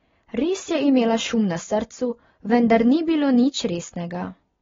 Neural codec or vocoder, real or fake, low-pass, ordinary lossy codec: none; real; 7.2 kHz; AAC, 24 kbps